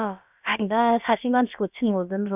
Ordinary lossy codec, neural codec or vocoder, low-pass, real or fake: none; codec, 16 kHz, about 1 kbps, DyCAST, with the encoder's durations; 3.6 kHz; fake